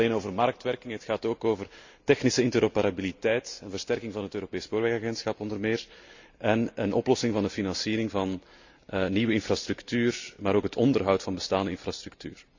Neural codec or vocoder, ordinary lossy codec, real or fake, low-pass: none; Opus, 64 kbps; real; 7.2 kHz